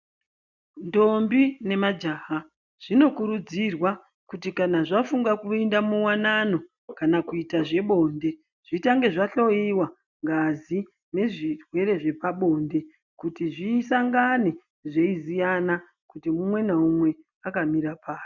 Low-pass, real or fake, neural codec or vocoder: 7.2 kHz; real; none